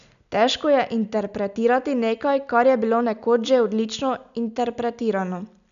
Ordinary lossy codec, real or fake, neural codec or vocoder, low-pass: none; real; none; 7.2 kHz